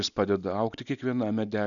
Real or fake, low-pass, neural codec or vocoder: real; 7.2 kHz; none